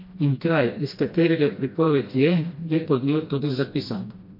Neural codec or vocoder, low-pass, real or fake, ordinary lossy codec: codec, 16 kHz, 1 kbps, FreqCodec, smaller model; 5.4 kHz; fake; MP3, 32 kbps